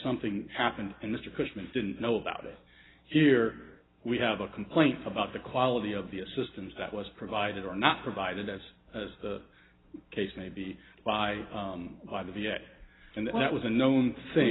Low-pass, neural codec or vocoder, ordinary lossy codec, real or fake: 7.2 kHz; none; AAC, 16 kbps; real